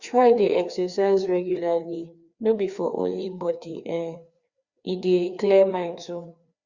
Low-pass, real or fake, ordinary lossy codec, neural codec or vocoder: 7.2 kHz; fake; Opus, 64 kbps; codec, 16 kHz, 2 kbps, FreqCodec, larger model